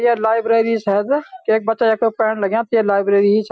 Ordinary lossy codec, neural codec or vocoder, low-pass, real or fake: none; none; none; real